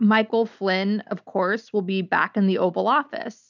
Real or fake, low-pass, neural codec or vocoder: real; 7.2 kHz; none